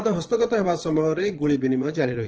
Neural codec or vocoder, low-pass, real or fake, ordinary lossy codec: vocoder, 22.05 kHz, 80 mel bands, Vocos; 7.2 kHz; fake; Opus, 16 kbps